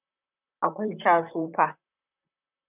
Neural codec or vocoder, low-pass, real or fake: vocoder, 44.1 kHz, 128 mel bands, Pupu-Vocoder; 3.6 kHz; fake